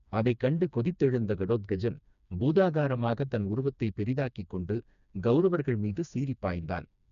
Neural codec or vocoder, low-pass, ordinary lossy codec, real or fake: codec, 16 kHz, 2 kbps, FreqCodec, smaller model; 7.2 kHz; none; fake